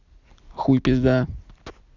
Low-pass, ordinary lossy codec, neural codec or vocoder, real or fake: 7.2 kHz; none; none; real